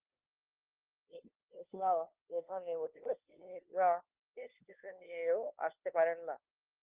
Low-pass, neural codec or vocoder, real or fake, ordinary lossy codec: 3.6 kHz; codec, 16 kHz, 2 kbps, FunCodec, trained on LibriTTS, 25 frames a second; fake; Opus, 32 kbps